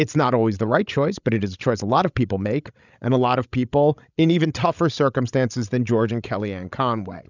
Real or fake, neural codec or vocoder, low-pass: fake; codec, 16 kHz, 16 kbps, FreqCodec, larger model; 7.2 kHz